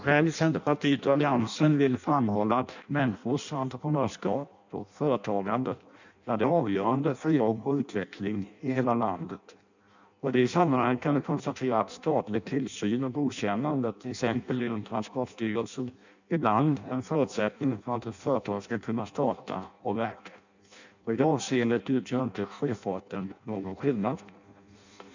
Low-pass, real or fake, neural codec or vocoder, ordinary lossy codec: 7.2 kHz; fake; codec, 16 kHz in and 24 kHz out, 0.6 kbps, FireRedTTS-2 codec; none